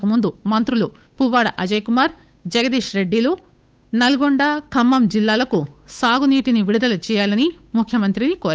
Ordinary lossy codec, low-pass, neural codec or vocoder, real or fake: none; none; codec, 16 kHz, 8 kbps, FunCodec, trained on Chinese and English, 25 frames a second; fake